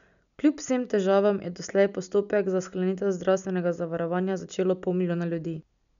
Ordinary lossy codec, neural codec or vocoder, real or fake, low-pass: none; none; real; 7.2 kHz